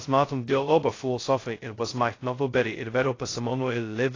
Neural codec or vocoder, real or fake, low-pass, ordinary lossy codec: codec, 16 kHz, 0.2 kbps, FocalCodec; fake; 7.2 kHz; AAC, 32 kbps